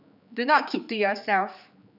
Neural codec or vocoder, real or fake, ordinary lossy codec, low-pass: codec, 16 kHz, 4 kbps, X-Codec, HuBERT features, trained on general audio; fake; none; 5.4 kHz